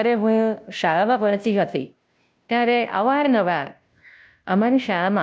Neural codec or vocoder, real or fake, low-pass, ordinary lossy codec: codec, 16 kHz, 0.5 kbps, FunCodec, trained on Chinese and English, 25 frames a second; fake; none; none